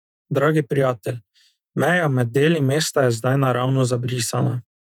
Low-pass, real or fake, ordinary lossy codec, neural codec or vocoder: 19.8 kHz; fake; none; vocoder, 48 kHz, 128 mel bands, Vocos